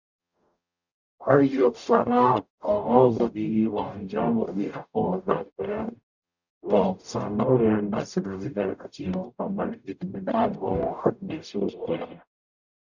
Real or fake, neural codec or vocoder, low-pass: fake; codec, 44.1 kHz, 0.9 kbps, DAC; 7.2 kHz